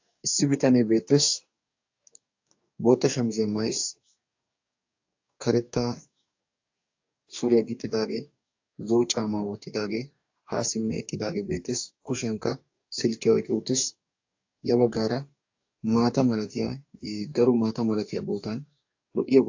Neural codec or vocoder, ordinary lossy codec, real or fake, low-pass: codec, 44.1 kHz, 2.6 kbps, DAC; AAC, 48 kbps; fake; 7.2 kHz